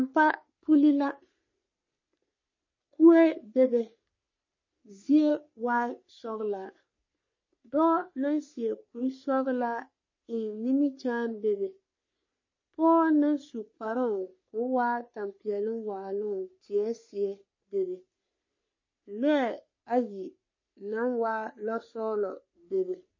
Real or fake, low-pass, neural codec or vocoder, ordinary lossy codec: fake; 7.2 kHz; codec, 44.1 kHz, 3.4 kbps, Pupu-Codec; MP3, 32 kbps